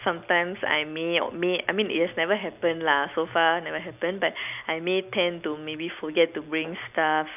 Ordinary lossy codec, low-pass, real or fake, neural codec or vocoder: none; 3.6 kHz; real; none